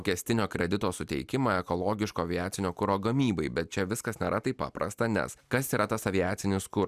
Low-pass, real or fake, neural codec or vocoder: 14.4 kHz; real; none